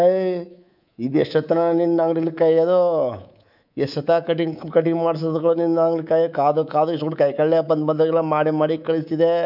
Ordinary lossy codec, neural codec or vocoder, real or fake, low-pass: none; codec, 24 kHz, 3.1 kbps, DualCodec; fake; 5.4 kHz